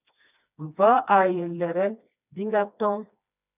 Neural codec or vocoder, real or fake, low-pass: codec, 16 kHz, 2 kbps, FreqCodec, smaller model; fake; 3.6 kHz